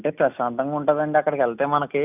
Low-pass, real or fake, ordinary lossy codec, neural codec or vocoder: 3.6 kHz; real; none; none